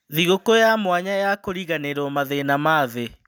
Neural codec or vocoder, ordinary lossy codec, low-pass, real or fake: none; none; none; real